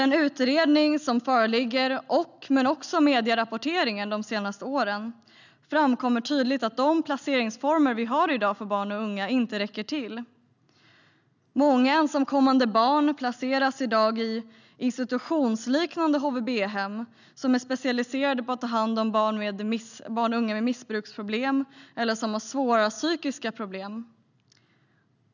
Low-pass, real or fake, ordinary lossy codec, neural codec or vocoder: 7.2 kHz; real; none; none